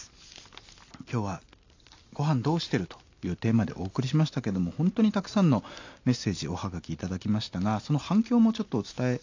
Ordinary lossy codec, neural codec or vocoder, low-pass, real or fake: AAC, 48 kbps; none; 7.2 kHz; real